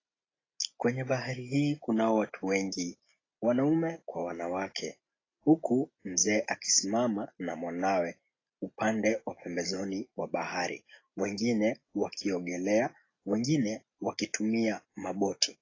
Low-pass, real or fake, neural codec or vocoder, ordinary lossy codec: 7.2 kHz; real; none; AAC, 32 kbps